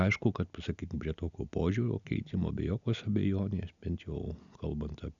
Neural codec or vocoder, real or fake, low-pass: none; real; 7.2 kHz